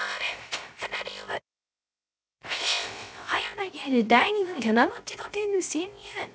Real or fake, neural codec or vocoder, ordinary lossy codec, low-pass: fake; codec, 16 kHz, 0.3 kbps, FocalCodec; none; none